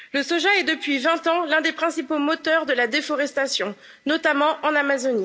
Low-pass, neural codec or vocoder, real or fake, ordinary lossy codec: none; none; real; none